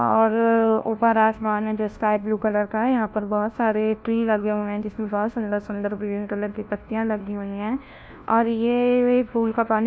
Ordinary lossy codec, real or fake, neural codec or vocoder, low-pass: none; fake; codec, 16 kHz, 1 kbps, FunCodec, trained on LibriTTS, 50 frames a second; none